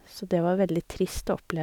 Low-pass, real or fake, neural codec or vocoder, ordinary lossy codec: 19.8 kHz; real; none; none